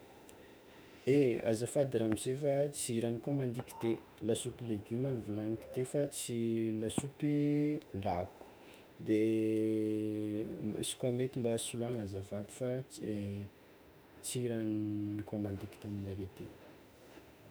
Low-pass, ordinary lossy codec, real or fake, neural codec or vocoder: none; none; fake; autoencoder, 48 kHz, 32 numbers a frame, DAC-VAE, trained on Japanese speech